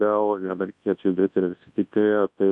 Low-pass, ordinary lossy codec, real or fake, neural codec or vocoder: 10.8 kHz; MP3, 48 kbps; fake; codec, 24 kHz, 0.9 kbps, WavTokenizer, large speech release